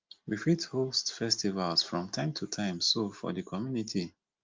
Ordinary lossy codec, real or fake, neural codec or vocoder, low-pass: Opus, 32 kbps; real; none; 7.2 kHz